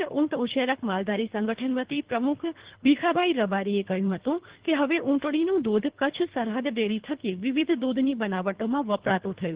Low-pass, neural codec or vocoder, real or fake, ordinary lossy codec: 3.6 kHz; codec, 24 kHz, 3 kbps, HILCodec; fake; Opus, 16 kbps